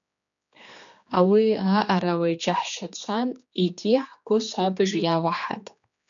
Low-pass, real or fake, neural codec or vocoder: 7.2 kHz; fake; codec, 16 kHz, 2 kbps, X-Codec, HuBERT features, trained on general audio